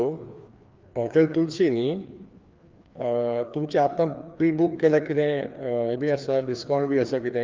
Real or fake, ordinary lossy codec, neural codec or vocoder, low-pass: fake; Opus, 32 kbps; codec, 16 kHz, 2 kbps, FreqCodec, larger model; 7.2 kHz